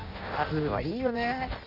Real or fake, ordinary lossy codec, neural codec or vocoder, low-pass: fake; none; codec, 16 kHz in and 24 kHz out, 0.6 kbps, FireRedTTS-2 codec; 5.4 kHz